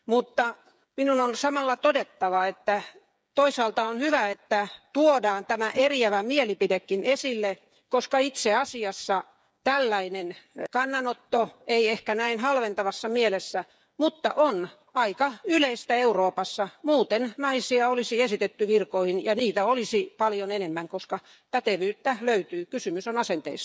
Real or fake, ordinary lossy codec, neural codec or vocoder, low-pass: fake; none; codec, 16 kHz, 8 kbps, FreqCodec, smaller model; none